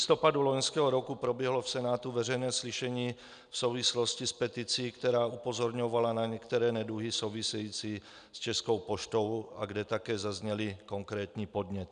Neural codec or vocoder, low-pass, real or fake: none; 9.9 kHz; real